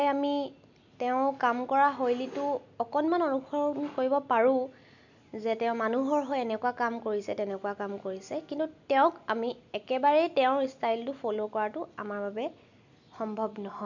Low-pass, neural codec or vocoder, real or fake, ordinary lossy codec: 7.2 kHz; none; real; none